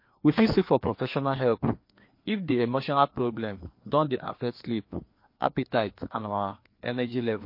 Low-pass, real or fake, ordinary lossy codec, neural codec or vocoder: 5.4 kHz; fake; MP3, 32 kbps; codec, 16 kHz, 2 kbps, FreqCodec, larger model